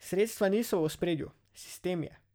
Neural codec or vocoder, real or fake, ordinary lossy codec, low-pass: none; real; none; none